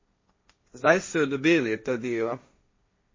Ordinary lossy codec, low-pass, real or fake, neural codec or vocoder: MP3, 32 kbps; 7.2 kHz; fake; codec, 16 kHz, 1.1 kbps, Voila-Tokenizer